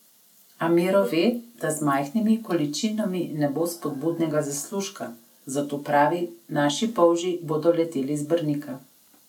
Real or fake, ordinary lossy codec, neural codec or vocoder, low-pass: real; none; none; 19.8 kHz